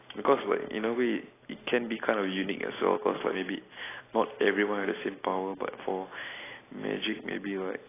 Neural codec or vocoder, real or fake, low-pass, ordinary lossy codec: none; real; 3.6 kHz; AAC, 16 kbps